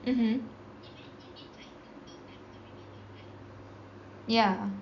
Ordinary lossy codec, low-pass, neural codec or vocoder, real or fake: none; 7.2 kHz; none; real